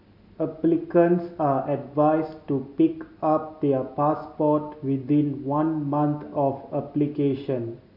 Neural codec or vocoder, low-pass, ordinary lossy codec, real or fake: none; 5.4 kHz; none; real